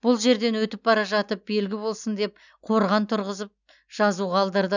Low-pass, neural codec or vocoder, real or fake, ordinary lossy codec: 7.2 kHz; none; real; none